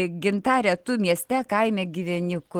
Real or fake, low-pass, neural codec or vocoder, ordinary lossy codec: real; 19.8 kHz; none; Opus, 16 kbps